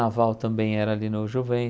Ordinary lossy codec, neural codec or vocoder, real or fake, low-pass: none; none; real; none